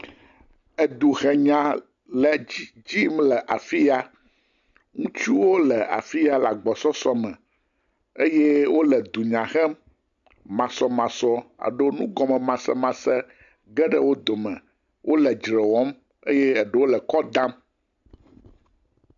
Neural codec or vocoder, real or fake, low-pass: none; real; 7.2 kHz